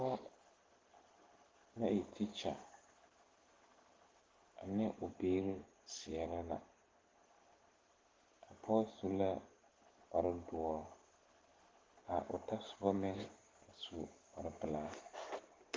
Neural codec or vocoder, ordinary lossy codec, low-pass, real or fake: none; Opus, 16 kbps; 7.2 kHz; real